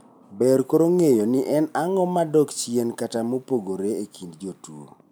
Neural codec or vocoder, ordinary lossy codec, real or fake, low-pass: none; none; real; none